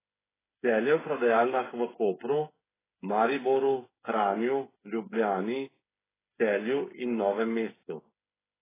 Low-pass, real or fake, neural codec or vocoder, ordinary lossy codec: 3.6 kHz; fake; codec, 16 kHz, 8 kbps, FreqCodec, smaller model; AAC, 16 kbps